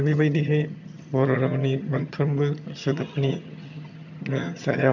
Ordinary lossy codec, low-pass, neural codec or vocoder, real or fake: none; 7.2 kHz; vocoder, 22.05 kHz, 80 mel bands, HiFi-GAN; fake